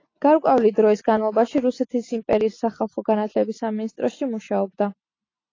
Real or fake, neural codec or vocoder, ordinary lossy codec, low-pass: real; none; AAC, 32 kbps; 7.2 kHz